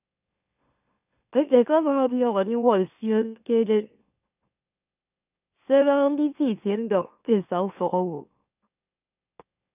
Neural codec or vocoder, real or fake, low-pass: autoencoder, 44.1 kHz, a latent of 192 numbers a frame, MeloTTS; fake; 3.6 kHz